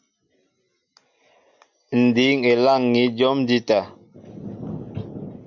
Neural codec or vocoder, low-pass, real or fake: none; 7.2 kHz; real